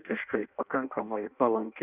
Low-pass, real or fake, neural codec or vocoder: 3.6 kHz; fake; codec, 16 kHz in and 24 kHz out, 0.6 kbps, FireRedTTS-2 codec